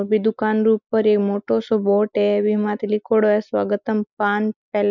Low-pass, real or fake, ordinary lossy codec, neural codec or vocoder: 7.2 kHz; real; none; none